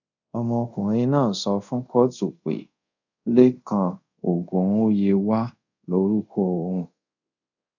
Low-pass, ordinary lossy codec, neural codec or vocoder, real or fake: 7.2 kHz; none; codec, 24 kHz, 0.5 kbps, DualCodec; fake